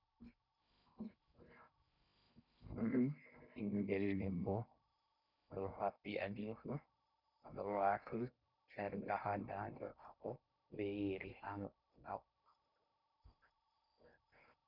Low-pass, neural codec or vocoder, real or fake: 5.4 kHz; codec, 16 kHz in and 24 kHz out, 0.6 kbps, FocalCodec, streaming, 2048 codes; fake